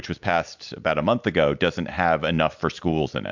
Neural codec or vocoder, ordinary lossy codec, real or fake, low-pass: none; MP3, 64 kbps; real; 7.2 kHz